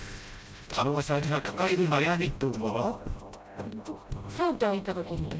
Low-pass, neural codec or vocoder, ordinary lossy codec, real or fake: none; codec, 16 kHz, 0.5 kbps, FreqCodec, smaller model; none; fake